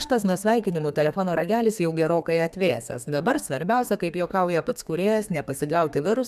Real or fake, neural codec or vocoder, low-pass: fake; codec, 32 kHz, 1.9 kbps, SNAC; 14.4 kHz